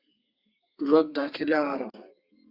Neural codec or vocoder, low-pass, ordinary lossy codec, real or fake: codec, 32 kHz, 1.9 kbps, SNAC; 5.4 kHz; Opus, 64 kbps; fake